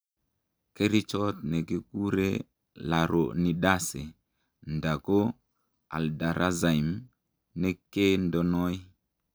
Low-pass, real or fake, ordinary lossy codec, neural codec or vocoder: none; real; none; none